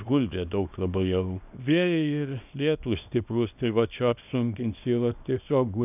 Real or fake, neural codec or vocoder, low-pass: fake; codec, 24 kHz, 0.9 kbps, WavTokenizer, small release; 3.6 kHz